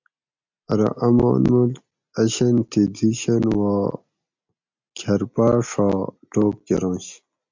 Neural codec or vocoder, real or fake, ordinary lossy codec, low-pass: none; real; MP3, 64 kbps; 7.2 kHz